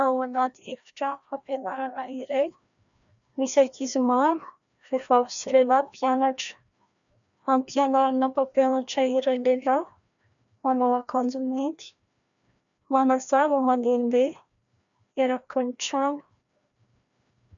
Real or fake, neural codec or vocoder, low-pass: fake; codec, 16 kHz, 1 kbps, FreqCodec, larger model; 7.2 kHz